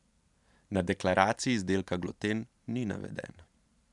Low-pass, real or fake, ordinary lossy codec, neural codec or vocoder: 10.8 kHz; fake; none; vocoder, 44.1 kHz, 128 mel bands every 512 samples, BigVGAN v2